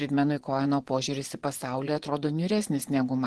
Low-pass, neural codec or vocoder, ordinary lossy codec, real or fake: 10.8 kHz; vocoder, 24 kHz, 100 mel bands, Vocos; Opus, 16 kbps; fake